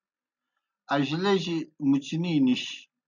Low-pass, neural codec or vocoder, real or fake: 7.2 kHz; none; real